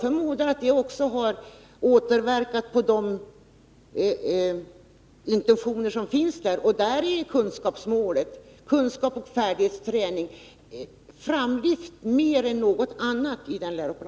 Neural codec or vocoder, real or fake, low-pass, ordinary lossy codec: none; real; none; none